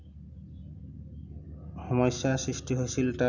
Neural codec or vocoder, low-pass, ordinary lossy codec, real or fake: none; 7.2 kHz; none; real